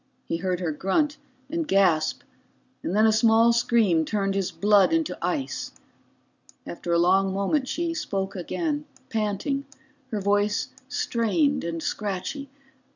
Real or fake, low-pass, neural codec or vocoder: real; 7.2 kHz; none